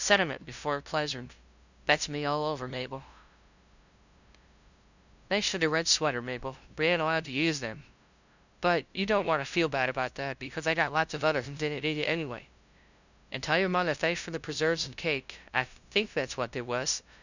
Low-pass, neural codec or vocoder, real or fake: 7.2 kHz; codec, 16 kHz, 0.5 kbps, FunCodec, trained on LibriTTS, 25 frames a second; fake